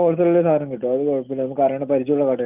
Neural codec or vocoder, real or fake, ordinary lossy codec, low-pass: none; real; Opus, 24 kbps; 3.6 kHz